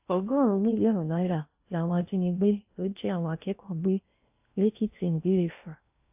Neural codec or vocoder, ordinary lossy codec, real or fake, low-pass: codec, 16 kHz in and 24 kHz out, 0.6 kbps, FocalCodec, streaming, 4096 codes; AAC, 32 kbps; fake; 3.6 kHz